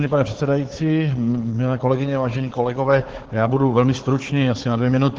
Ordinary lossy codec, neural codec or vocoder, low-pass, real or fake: Opus, 16 kbps; codec, 16 kHz, 4 kbps, FunCodec, trained on Chinese and English, 50 frames a second; 7.2 kHz; fake